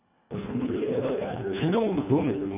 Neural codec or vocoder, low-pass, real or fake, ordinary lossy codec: codec, 24 kHz, 1.5 kbps, HILCodec; 3.6 kHz; fake; none